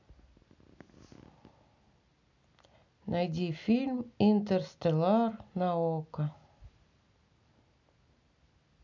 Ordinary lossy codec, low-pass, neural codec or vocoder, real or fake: none; 7.2 kHz; none; real